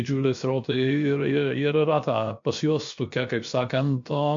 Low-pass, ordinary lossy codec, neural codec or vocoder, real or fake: 7.2 kHz; MP3, 48 kbps; codec, 16 kHz, 0.7 kbps, FocalCodec; fake